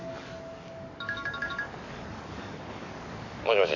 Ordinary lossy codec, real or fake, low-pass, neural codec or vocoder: none; fake; 7.2 kHz; codec, 44.1 kHz, 7.8 kbps, DAC